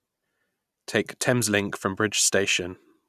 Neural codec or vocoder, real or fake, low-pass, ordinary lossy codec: none; real; 14.4 kHz; none